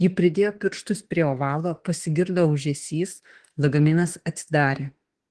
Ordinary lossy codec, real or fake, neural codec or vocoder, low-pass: Opus, 16 kbps; fake; autoencoder, 48 kHz, 32 numbers a frame, DAC-VAE, trained on Japanese speech; 10.8 kHz